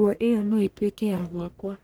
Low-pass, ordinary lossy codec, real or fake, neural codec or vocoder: none; none; fake; codec, 44.1 kHz, 1.7 kbps, Pupu-Codec